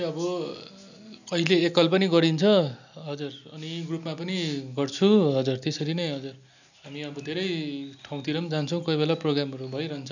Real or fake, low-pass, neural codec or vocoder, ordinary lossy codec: real; 7.2 kHz; none; none